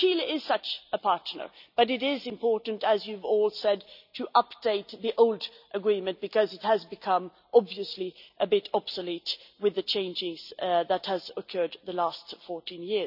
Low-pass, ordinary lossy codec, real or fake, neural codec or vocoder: 5.4 kHz; none; real; none